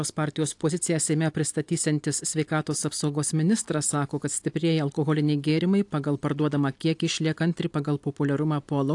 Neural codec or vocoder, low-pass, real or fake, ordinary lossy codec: none; 10.8 kHz; real; AAC, 64 kbps